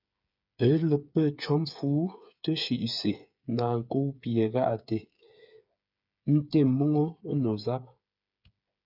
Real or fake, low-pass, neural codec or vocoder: fake; 5.4 kHz; codec, 16 kHz, 8 kbps, FreqCodec, smaller model